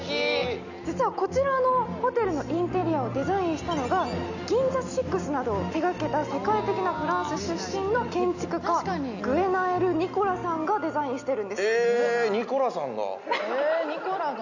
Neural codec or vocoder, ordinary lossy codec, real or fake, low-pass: none; none; real; 7.2 kHz